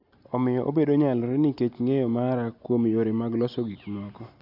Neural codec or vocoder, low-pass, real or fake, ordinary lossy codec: none; 5.4 kHz; real; none